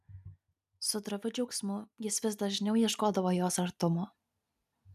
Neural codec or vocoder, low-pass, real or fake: none; 14.4 kHz; real